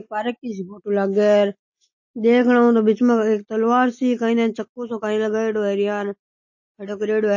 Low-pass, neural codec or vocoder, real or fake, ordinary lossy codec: 7.2 kHz; none; real; MP3, 32 kbps